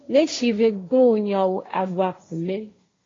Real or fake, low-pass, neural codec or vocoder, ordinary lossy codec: fake; 7.2 kHz; codec, 16 kHz, 1.1 kbps, Voila-Tokenizer; AAC, 32 kbps